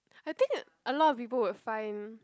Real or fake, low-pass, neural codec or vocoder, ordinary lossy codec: real; none; none; none